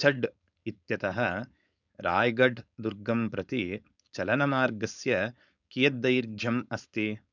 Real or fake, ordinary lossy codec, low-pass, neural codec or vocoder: fake; none; 7.2 kHz; codec, 16 kHz, 4.8 kbps, FACodec